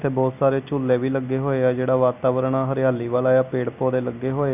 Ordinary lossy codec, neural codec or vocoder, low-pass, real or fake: none; none; 3.6 kHz; real